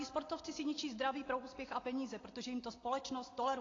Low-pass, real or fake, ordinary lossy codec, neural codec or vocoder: 7.2 kHz; real; AAC, 32 kbps; none